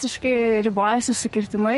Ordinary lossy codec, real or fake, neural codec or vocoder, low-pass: MP3, 48 kbps; fake; codec, 44.1 kHz, 2.6 kbps, SNAC; 14.4 kHz